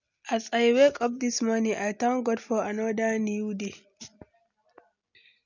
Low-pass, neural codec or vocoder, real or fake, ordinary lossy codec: 7.2 kHz; none; real; none